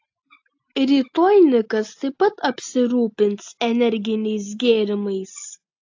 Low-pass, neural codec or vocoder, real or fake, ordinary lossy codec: 7.2 kHz; none; real; AAC, 48 kbps